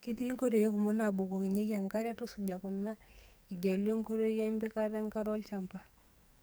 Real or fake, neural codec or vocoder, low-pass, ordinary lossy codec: fake; codec, 44.1 kHz, 2.6 kbps, SNAC; none; none